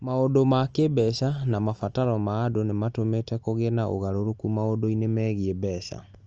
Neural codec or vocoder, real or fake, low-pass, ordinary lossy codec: none; real; 7.2 kHz; Opus, 24 kbps